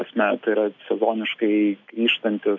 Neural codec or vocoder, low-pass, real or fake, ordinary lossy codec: none; 7.2 kHz; real; AAC, 48 kbps